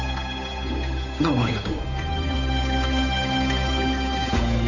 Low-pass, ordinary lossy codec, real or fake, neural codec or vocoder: 7.2 kHz; none; fake; codec, 16 kHz, 8 kbps, FunCodec, trained on Chinese and English, 25 frames a second